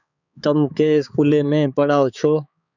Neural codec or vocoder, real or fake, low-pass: codec, 16 kHz, 4 kbps, X-Codec, HuBERT features, trained on balanced general audio; fake; 7.2 kHz